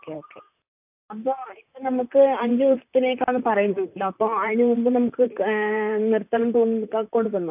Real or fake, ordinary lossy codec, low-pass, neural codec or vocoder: fake; AAC, 32 kbps; 3.6 kHz; vocoder, 44.1 kHz, 128 mel bands, Pupu-Vocoder